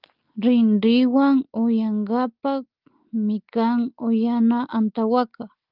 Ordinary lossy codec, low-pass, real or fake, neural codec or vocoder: Opus, 24 kbps; 5.4 kHz; real; none